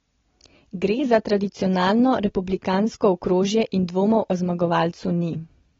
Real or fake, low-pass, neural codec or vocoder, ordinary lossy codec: real; 7.2 kHz; none; AAC, 24 kbps